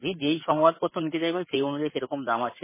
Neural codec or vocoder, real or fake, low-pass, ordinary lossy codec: codec, 16 kHz in and 24 kHz out, 2.2 kbps, FireRedTTS-2 codec; fake; 3.6 kHz; MP3, 16 kbps